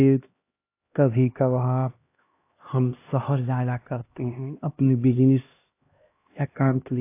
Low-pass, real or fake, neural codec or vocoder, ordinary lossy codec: 3.6 kHz; fake; codec, 16 kHz, 1 kbps, X-Codec, HuBERT features, trained on LibriSpeech; AAC, 24 kbps